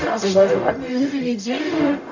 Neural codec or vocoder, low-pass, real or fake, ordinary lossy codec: codec, 44.1 kHz, 0.9 kbps, DAC; 7.2 kHz; fake; none